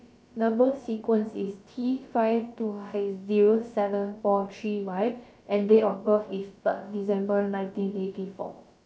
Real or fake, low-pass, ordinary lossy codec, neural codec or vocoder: fake; none; none; codec, 16 kHz, about 1 kbps, DyCAST, with the encoder's durations